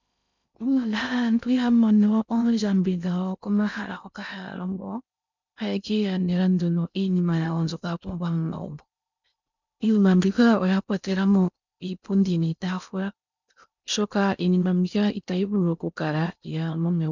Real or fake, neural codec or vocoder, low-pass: fake; codec, 16 kHz in and 24 kHz out, 0.6 kbps, FocalCodec, streaming, 2048 codes; 7.2 kHz